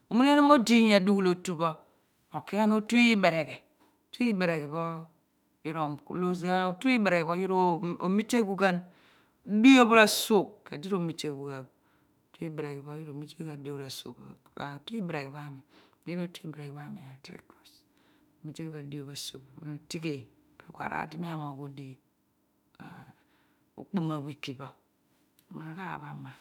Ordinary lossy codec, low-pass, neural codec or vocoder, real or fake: none; 19.8 kHz; autoencoder, 48 kHz, 32 numbers a frame, DAC-VAE, trained on Japanese speech; fake